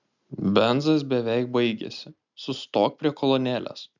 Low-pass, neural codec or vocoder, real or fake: 7.2 kHz; none; real